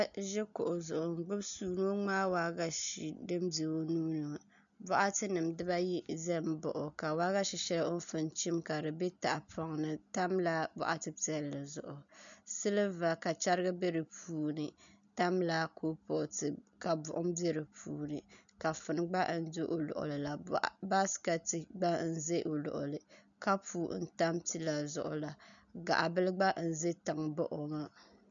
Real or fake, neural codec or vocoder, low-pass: real; none; 7.2 kHz